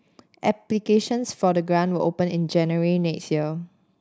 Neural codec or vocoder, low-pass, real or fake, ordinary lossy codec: none; none; real; none